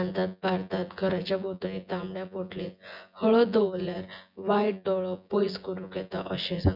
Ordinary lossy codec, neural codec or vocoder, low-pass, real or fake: none; vocoder, 24 kHz, 100 mel bands, Vocos; 5.4 kHz; fake